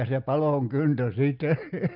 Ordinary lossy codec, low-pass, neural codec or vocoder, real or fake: Opus, 32 kbps; 5.4 kHz; none; real